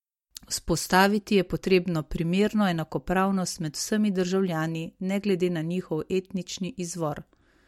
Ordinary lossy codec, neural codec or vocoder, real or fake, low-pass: MP3, 64 kbps; none; real; 19.8 kHz